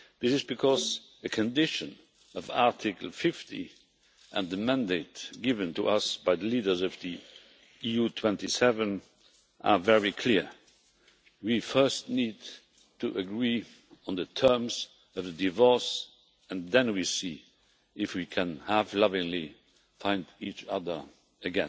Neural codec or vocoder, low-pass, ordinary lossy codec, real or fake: none; none; none; real